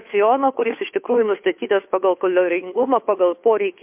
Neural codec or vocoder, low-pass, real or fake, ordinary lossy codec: codec, 16 kHz, 2 kbps, FunCodec, trained on Chinese and English, 25 frames a second; 3.6 kHz; fake; MP3, 32 kbps